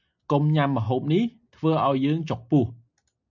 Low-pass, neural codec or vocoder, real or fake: 7.2 kHz; none; real